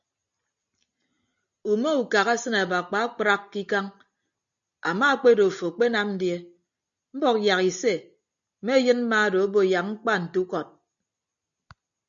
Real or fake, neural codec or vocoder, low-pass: real; none; 7.2 kHz